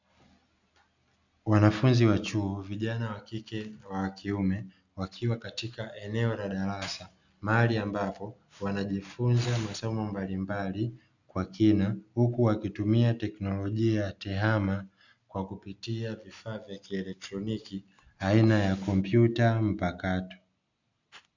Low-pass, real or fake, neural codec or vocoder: 7.2 kHz; real; none